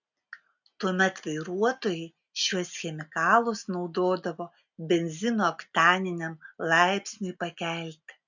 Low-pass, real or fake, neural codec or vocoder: 7.2 kHz; real; none